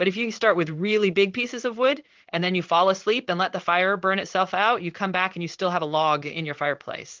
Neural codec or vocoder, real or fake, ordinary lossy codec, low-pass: codec, 16 kHz in and 24 kHz out, 1 kbps, XY-Tokenizer; fake; Opus, 32 kbps; 7.2 kHz